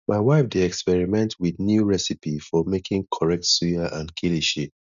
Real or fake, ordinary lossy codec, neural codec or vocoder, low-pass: real; none; none; 7.2 kHz